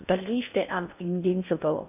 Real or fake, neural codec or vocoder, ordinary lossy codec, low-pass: fake; codec, 16 kHz in and 24 kHz out, 0.6 kbps, FocalCodec, streaming, 2048 codes; none; 3.6 kHz